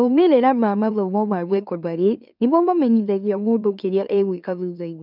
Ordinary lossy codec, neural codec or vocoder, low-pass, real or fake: none; autoencoder, 44.1 kHz, a latent of 192 numbers a frame, MeloTTS; 5.4 kHz; fake